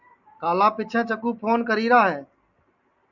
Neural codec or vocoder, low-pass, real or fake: none; 7.2 kHz; real